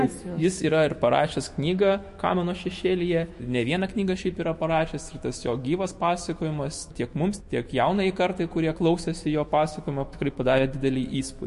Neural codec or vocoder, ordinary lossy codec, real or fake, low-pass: autoencoder, 48 kHz, 128 numbers a frame, DAC-VAE, trained on Japanese speech; MP3, 48 kbps; fake; 14.4 kHz